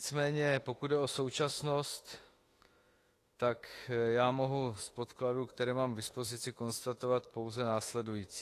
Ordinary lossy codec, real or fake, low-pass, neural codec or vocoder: AAC, 48 kbps; fake; 14.4 kHz; autoencoder, 48 kHz, 32 numbers a frame, DAC-VAE, trained on Japanese speech